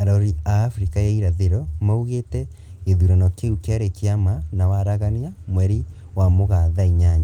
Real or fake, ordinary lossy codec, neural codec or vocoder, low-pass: real; Opus, 24 kbps; none; 19.8 kHz